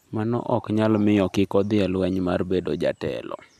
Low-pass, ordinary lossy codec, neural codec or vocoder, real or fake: 14.4 kHz; none; none; real